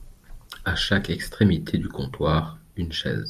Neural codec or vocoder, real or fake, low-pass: none; real; 14.4 kHz